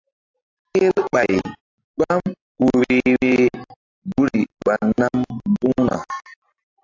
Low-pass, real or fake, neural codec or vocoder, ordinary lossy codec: 7.2 kHz; real; none; AAC, 48 kbps